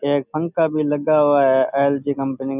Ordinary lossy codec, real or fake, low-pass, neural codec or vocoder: none; real; 3.6 kHz; none